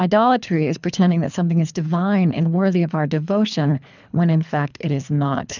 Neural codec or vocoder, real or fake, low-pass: codec, 24 kHz, 3 kbps, HILCodec; fake; 7.2 kHz